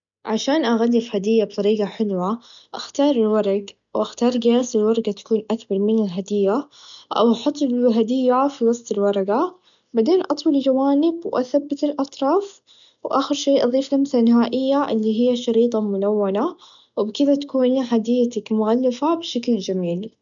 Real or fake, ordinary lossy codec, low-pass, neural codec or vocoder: real; none; 7.2 kHz; none